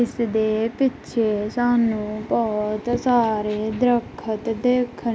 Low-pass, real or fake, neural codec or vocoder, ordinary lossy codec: none; real; none; none